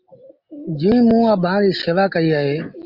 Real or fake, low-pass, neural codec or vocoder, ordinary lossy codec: real; 5.4 kHz; none; Opus, 32 kbps